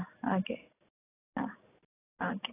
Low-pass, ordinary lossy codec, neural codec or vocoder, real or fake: 3.6 kHz; AAC, 16 kbps; none; real